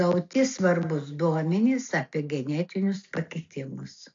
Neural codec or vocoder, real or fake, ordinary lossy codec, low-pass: none; real; AAC, 48 kbps; 7.2 kHz